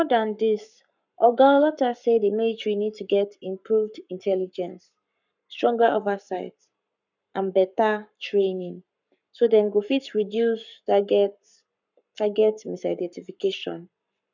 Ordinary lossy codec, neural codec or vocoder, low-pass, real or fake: none; codec, 44.1 kHz, 7.8 kbps, Pupu-Codec; 7.2 kHz; fake